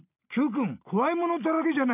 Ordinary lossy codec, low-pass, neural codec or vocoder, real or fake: none; 3.6 kHz; none; real